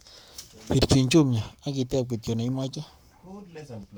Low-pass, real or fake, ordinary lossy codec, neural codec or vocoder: none; fake; none; codec, 44.1 kHz, 7.8 kbps, Pupu-Codec